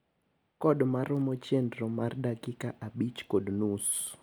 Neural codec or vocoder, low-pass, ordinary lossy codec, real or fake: none; none; none; real